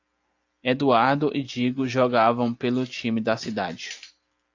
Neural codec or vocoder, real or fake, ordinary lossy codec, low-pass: none; real; AAC, 48 kbps; 7.2 kHz